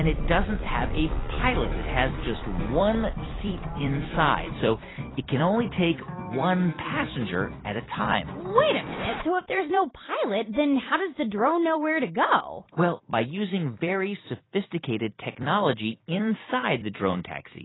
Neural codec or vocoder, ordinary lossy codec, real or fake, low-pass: vocoder, 44.1 kHz, 128 mel bands every 256 samples, BigVGAN v2; AAC, 16 kbps; fake; 7.2 kHz